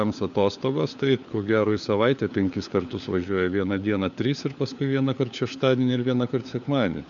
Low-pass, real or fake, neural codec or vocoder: 7.2 kHz; fake; codec, 16 kHz, 4 kbps, FunCodec, trained on LibriTTS, 50 frames a second